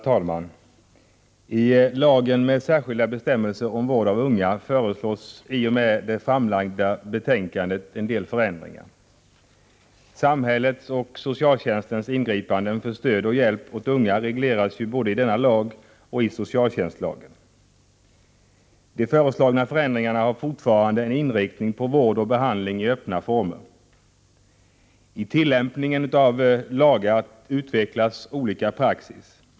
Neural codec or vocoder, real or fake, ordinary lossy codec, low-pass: none; real; none; none